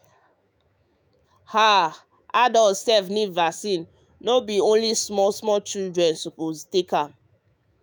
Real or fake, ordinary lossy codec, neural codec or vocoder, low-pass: fake; none; autoencoder, 48 kHz, 128 numbers a frame, DAC-VAE, trained on Japanese speech; none